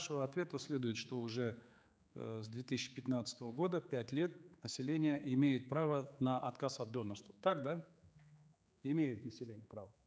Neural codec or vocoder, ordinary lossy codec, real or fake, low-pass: codec, 16 kHz, 4 kbps, X-Codec, HuBERT features, trained on general audio; none; fake; none